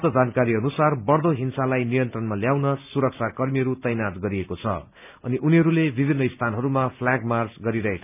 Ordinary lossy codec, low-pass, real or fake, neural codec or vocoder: none; 3.6 kHz; real; none